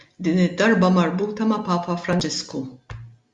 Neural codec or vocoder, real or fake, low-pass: none; real; 10.8 kHz